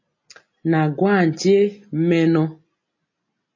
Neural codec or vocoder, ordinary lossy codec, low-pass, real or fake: none; MP3, 32 kbps; 7.2 kHz; real